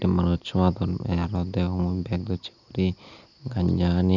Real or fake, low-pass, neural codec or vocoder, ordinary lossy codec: real; 7.2 kHz; none; none